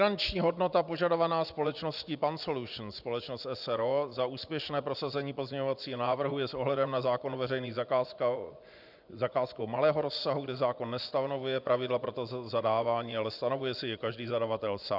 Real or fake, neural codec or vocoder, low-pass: fake; vocoder, 24 kHz, 100 mel bands, Vocos; 5.4 kHz